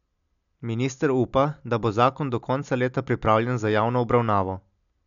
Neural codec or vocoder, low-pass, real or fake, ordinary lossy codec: none; 7.2 kHz; real; none